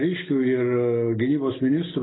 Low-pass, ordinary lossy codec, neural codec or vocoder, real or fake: 7.2 kHz; AAC, 16 kbps; none; real